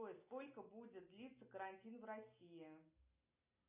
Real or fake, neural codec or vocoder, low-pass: real; none; 3.6 kHz